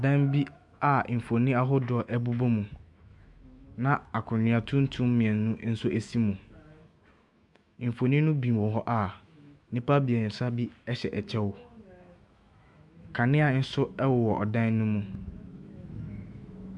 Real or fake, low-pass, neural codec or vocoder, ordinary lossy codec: fake; 10.8 kHz; autoencoder, 48 kHz, 128 numbers a frame, DAC-VAE, trained on Japanese speech; MP3, 96 kbps